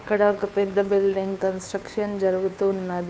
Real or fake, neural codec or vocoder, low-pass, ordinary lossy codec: fake; codec, 16 kHz, 2 kbps, FunCodec, trained on Chinese and English, 25 frames a second; none; none